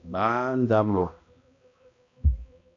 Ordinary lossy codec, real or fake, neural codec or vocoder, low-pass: AAC, 48 kbps; fake; codec, 16 kHz, 1 kbps, X-Codec, HuBERT features, trained on balanced general audio; 7.2 kHz